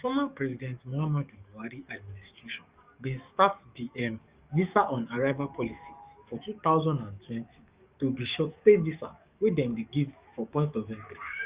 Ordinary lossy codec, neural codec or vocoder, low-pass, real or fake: Opus, 64 kbps; codec, 44.1 kHz, 7.8 kbps, DAC; 3.6 kHz; fake